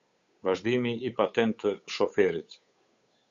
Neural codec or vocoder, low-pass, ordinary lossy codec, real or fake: codec, 16 kHz, 8 kbps, FunCodec, trained on Chinese and English, 25 frames a second; 7.2 kHz; Opus, 64 kbps; fake